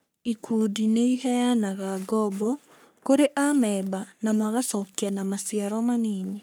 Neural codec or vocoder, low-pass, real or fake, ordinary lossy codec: codec, 44.1 kHz, 3.4 kbps, Pupu-Codec; none; fake; none